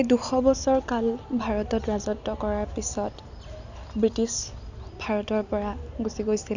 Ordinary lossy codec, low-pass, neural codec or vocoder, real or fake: none; 7.2 kHz; none; real